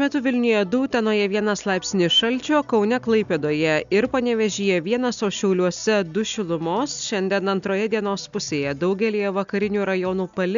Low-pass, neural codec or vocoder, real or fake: 7.2 kHz; none; real